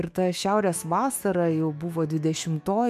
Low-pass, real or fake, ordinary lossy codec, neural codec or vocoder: 14.4 kHz; fake; MP3, 96 kbps; autoencoder, 48 kHz, 128 numbers a frame, DAC-VAE, trained on Japanese speech